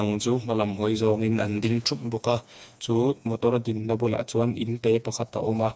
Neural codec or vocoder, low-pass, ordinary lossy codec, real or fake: codec, 16 kHz, 2 kbps, FreqCodec, smaller model; none; none; fake